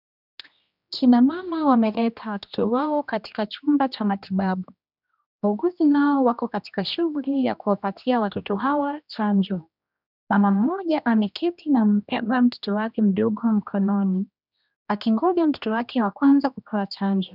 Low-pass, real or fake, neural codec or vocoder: 5.4 kHz; fake; codec, 16 kHz, 1 kbps, X-Codec, HuBERT features, trained on general audio